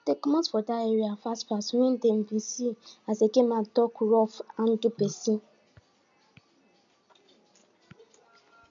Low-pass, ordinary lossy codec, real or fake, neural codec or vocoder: 7.2 kHz; none; real; none